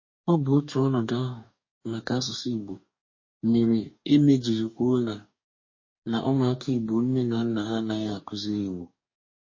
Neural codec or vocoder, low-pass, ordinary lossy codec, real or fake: codec, 44.1 kHz, 2.6 kbps, DAC; 7.2 kHz; MP3, 32 kbps; fake